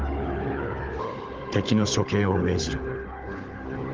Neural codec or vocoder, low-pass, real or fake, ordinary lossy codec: codec, 16 kHz, 16 kbps, FunCodec, trained on Chinese and English, 50 frames a second; 7.2 kHz; fake; Opus, 16 kbps